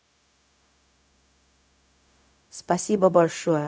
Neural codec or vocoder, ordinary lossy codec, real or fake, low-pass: codec, 16 kHz, 0.4 kbps, LongCat-Audio-Codec; none; fake; none